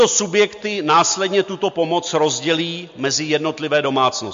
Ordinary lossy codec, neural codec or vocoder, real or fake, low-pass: MP3, 48 kbps; none; real; 7.2 kHz